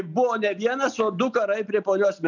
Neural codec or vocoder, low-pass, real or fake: none; 7.2 kHz; real